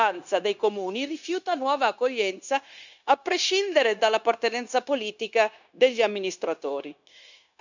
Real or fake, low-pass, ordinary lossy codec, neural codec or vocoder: fake; 7.2 kHz; none; codec, 16 kHz, 0.9 kbps, LongCat-Audio-Codec